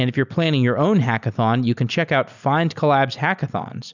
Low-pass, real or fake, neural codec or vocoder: 7.2 kHz; real; none